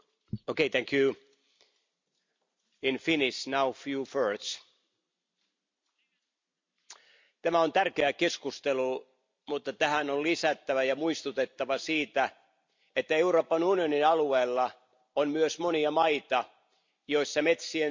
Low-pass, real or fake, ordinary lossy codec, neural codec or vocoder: 7.2 kHz; fake; MP3, 64 kbps; vocoder, 44.1 kHz, 128 mel bands every 256 samples, BigVGAN v2